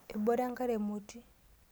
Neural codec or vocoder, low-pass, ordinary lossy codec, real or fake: none; none; none; real